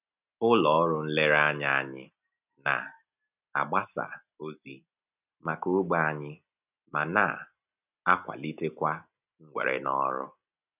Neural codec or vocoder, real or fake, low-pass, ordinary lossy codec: none; real; 3.6 kHz; none